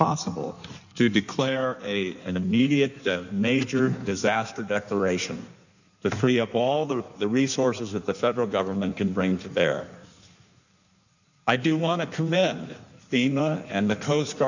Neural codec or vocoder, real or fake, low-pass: codec, 16 kHz in and 24 kHz out, 1.1 kbps, FireRedTTS-2 codec; fake; 7.2 kHz